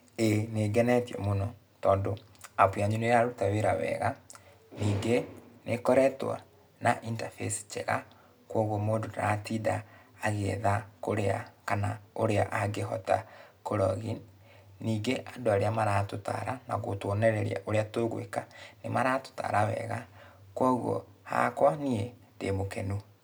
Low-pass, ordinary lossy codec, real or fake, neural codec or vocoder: none; none; real; none